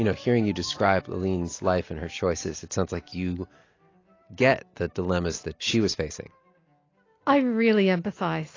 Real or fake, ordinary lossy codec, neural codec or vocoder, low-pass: real; AAC, 32 kbps; none; 7.2 kHz